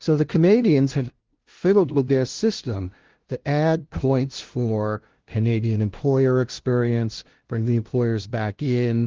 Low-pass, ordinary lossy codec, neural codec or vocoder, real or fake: 7.2 kHz; Opus, 16 kbps; codec, 16 kHz, 0.5 kbps, FunCodec, trained on LibriTTS, 25 frames a second; fake